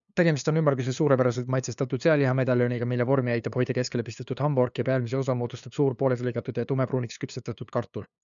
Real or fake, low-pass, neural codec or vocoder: fake; 7.2 kHz; codec, 16 kHz, 2 kbps, FunCodec, trained on LibriTTS, 25 frames a second